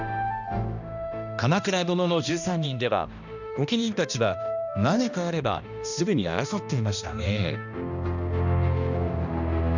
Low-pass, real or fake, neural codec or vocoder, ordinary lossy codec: 7.2 kHz; fake; codec, 16 kHz, 1 kbps, X-Codec, HuBERT features, trained on balanced general audio; none